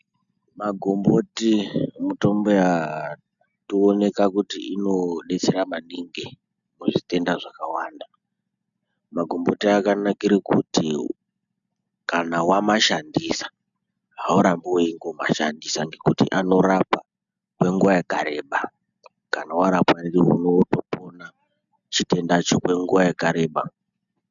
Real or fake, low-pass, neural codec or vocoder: real; 7.2 kHz; none